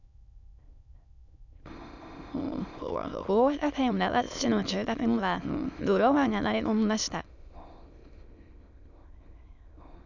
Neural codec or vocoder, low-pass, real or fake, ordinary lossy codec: autoencoder, 22.05 kHz, a latent of 192 numbers a frame, VITS, trained on many speakers; 7.2 kHz; fake; none